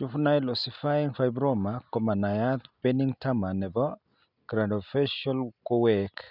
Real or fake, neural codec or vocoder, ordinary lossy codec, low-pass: real; none; none; 5.4 kHz